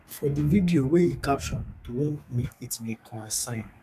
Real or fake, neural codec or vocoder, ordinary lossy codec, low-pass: fake; codec, 32 kHz, 1.9 kbps, SNAC; none; 14.4 kHz